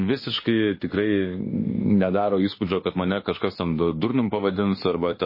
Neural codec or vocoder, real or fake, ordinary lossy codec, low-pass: autoencoder, 48 kHz, 32 numbers a frame, DAC-VAE, trained on Japanese speech; fake; MP3, 24 kbps; 5.4 kHz